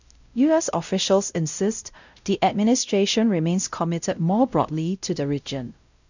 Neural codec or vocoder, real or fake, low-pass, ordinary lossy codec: codec, 16 kHz, 0.5 kbps, X-Codec, WavLM features, trained on Multilingual LibriSpeech; fake; 7.2 kHz; none